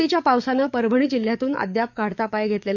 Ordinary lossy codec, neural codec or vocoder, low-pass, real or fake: none; codec, 44.1 kHz, 7.8 kbps, DAC; 7.2 kHz; fake